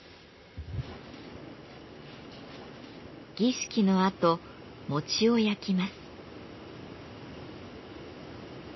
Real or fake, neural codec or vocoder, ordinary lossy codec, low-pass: real; none; MP3, 24 kbps; 7.2 kHz